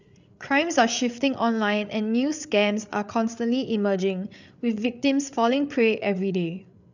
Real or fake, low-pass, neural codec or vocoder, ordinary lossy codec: fake; 7.2 kHz; codec, 16 kHz, 8 kbps, FreqCodec, larger model; none